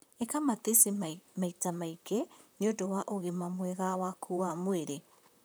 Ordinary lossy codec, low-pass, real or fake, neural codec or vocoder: none; none; fake; vocoder, 44.1 kHz, 128 mel bands, Pupu-Vocoder